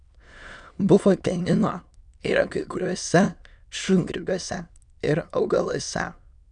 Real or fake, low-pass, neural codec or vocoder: fake; 9.9 kHz; autoencoder, 22.05 kHz, a latent of 192 numbers a frame, VITS, trained on many speakers